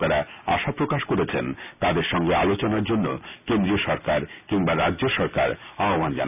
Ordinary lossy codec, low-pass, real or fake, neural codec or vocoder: none; 3.6 kHz; real; none